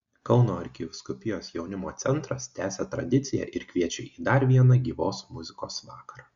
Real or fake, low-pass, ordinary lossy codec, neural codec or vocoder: real; 7.2 kHz; Opus, 64 kbps; none